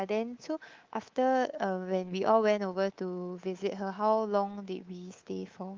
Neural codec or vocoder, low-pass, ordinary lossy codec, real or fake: codec, 24 kHz, 3.1 kbps, DualCodec; 7.2 kHz; Opus, 32 kbps; fake